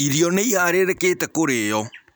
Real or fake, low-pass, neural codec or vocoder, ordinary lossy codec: real; none; none; none